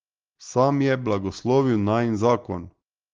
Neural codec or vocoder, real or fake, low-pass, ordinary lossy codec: none; real; 7.2 kHz; Opus, 16 kbps